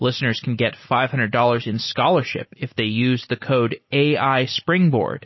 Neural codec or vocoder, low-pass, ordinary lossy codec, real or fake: none; 7.2 kHz; MP3, 24 kbps; real